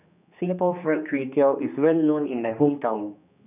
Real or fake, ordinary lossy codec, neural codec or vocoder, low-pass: fake; none; codec, 16 kHz, 2 kbps, X-Codec, HuBERT features, trained on general audio; 3.6 kHz